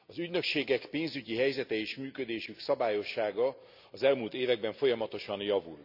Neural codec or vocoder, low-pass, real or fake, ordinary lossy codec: none; 5.4 kHz; real; none